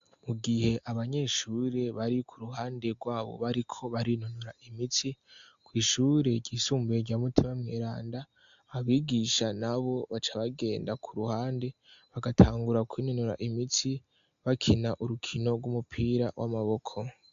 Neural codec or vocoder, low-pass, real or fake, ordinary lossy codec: none; 7.2 kHz; real; AAC, 64 kbps